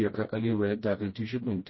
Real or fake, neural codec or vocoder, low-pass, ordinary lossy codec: fake; codec, 16 kHz, 1 kbps, FreqCodec, smaller model; 7.2 kHz; MP3, 24 kbps